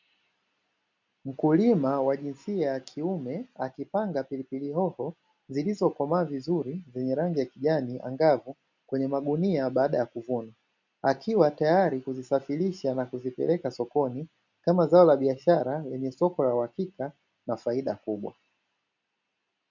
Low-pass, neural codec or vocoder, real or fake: 7.2 kHz; none; real